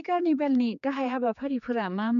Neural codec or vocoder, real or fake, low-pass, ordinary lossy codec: codec, 16 kHz, 4 kbps, X-Codec, HuBERT features, trained on general audio; fake; 7.2 kHz; none